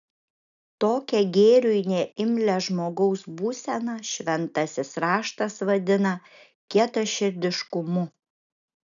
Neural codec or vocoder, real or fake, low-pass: none; real; 7.2 kHz